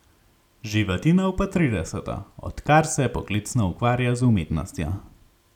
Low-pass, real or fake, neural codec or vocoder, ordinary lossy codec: 19.8 kHz; real; none; none